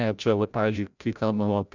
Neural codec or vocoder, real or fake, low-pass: codec, 16 kHz, 0.5 kbps, FreqCodec, larger model; fake; 7.2 kHz